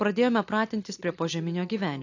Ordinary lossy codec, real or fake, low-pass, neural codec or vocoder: AAC, 48 kbps; real; 7.2 kHz; none